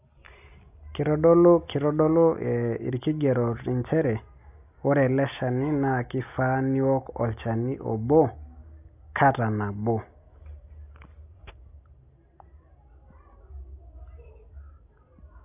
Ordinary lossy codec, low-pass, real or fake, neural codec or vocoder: AAC, 32 kbps; 3.6 kHz; real; none